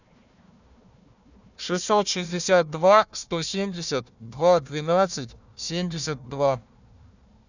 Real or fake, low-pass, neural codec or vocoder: fake; 7.2 kHz; codec, 16 kHz, 1 kbps, FunCodec, trained on Chinese and English, 50 frames a second